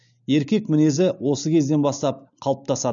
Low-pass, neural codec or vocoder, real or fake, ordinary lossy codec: 7.2 kHz; none; real; none